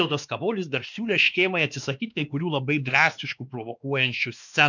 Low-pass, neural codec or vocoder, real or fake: 7.2 kHz; codec, 16 kHz, 2 kbps, X-Codec, WavLM features, trained on Multilingual LibriSpeech; fake